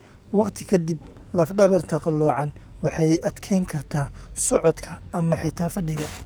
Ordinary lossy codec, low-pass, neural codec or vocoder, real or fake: none; none; codec, 44.1 kHz, 2.6 kbps, SNAC; fake